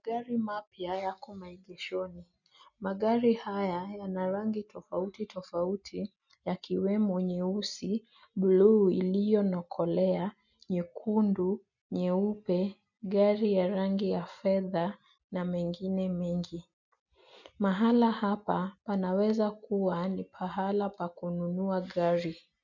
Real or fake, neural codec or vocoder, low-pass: real; none; 7.2 kHz